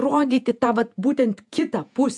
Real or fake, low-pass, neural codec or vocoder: fake; 10.8 kHz; vocoder, 48 kHz, 128 mel bands, Vocos